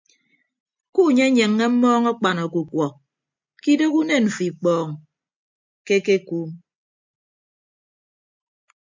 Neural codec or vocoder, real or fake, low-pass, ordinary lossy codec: none; real; 7.2 kHz; MP3, 64 kbps